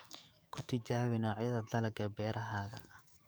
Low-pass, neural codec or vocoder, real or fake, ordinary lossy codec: none; codec, 44.1 kHz, 7.8 kbps, DAC; fake; none